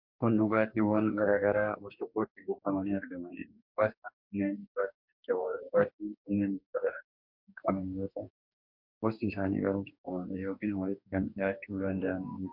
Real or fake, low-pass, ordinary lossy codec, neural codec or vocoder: fake; 5.4 kHz; MP3, 48 kbps; codec, 44.1 kHz, 2.6 kbps, DAC